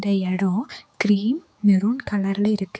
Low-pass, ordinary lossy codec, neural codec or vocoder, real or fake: none; none; codec, 16 kHz, 4 kbps, X-Codec, HuBERT features, trained on general audio; fake